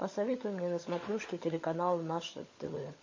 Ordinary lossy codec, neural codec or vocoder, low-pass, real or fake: MP3, 32 kbps; codec, 16 kHz, 16 kbps, FunCodec, trained on LibriTTS, 50 frames a second; 7.2 kHz; fake